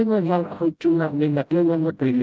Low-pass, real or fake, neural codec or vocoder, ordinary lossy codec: none; fake; codec, 16 kHz, 0.5 kbps, FreqCodec, smaller model; none